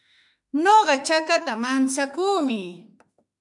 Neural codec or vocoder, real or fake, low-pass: autoencoder, 48 kHz, 32 numbers a frame, DAC-VAE, trained on Japanese speech; fake; 10.8 kHz